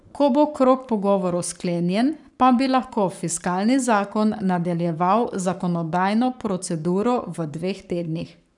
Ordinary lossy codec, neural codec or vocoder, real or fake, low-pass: none; codec, 44.1 kHz, 7.8 kbps, Pupu-Codec; fake; 10.8 kHz